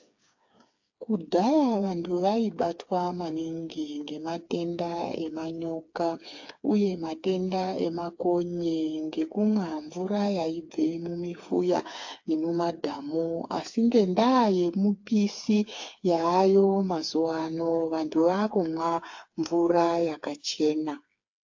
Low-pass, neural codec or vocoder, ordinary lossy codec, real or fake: 7.2 kHz; codec, 16 kHz, 4 kbps, FreqCodec, smaller model; AAC, 48 kbps; fake